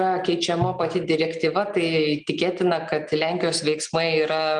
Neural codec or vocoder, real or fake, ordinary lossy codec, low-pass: none; real; Opus, 32 kbps; 9.9 kHz